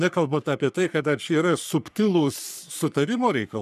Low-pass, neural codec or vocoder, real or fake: 14.4 kHz; codec, 44.1 kHz, 3.4 kbps, Pupu-Codec; fake